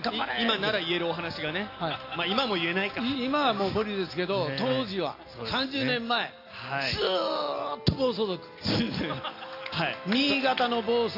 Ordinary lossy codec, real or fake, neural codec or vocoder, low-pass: AAC, 32 kbps; real; none; 5.4 kHz